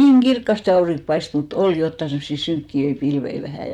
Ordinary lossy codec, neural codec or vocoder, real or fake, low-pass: none; vocoder, 44.1 kHz, 128 mel bands, Pupu-Vocoder; fake; 19.8 kHz